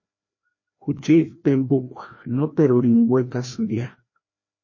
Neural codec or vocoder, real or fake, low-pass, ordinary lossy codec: codec, 16 kHz, 1 kbps, FreqCodec, larger model; fake; 7.2 kHz; MP3, 32 kbps